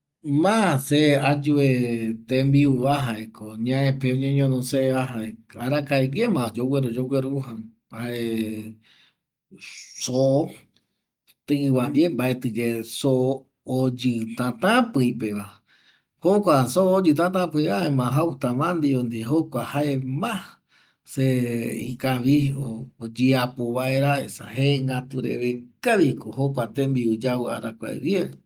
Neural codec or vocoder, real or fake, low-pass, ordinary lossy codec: none; real; 19.8 kHz; Opus, 32 kbps